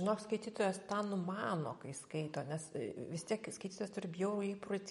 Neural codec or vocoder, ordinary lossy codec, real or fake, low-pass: none; MP3, 48 kbps; real; 19.8 kHz